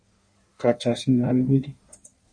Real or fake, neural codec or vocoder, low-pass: fake; codec, 16 kHz in and 24 kHz out, 1.1 kbps, FireRedTTS-2 codec; 9.9 kHz